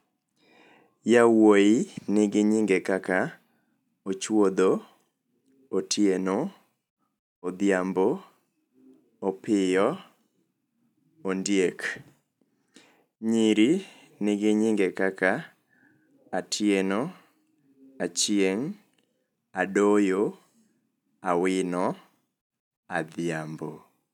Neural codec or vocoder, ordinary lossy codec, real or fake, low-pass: none; none; real; 19.8 kHz